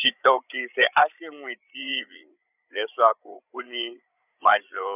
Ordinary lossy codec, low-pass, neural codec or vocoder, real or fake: none; 3.6 kHz; codec, 16 kHz, 8 kbps, FreqCodec, larger model; fake